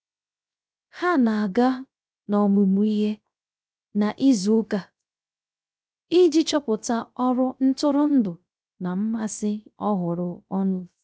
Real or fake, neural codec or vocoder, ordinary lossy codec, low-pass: fake; codec, 16 kHz, 0.3 kbps, FocalCodec; none; none